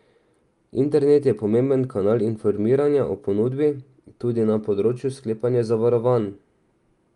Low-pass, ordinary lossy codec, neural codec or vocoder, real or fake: 10.8 kHz; Opus, 32 kbps; none; real